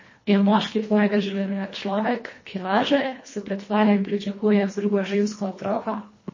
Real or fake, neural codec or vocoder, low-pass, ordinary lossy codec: fake; codec, 24 kHz, 1.5 kbps, HILCodec; 7.2 kHz; MP3, 32 kbps